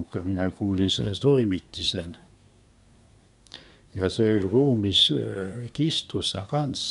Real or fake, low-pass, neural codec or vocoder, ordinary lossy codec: fake; 10.8 kHz; codec, 24 kHz, 1 kbps, SNAC; none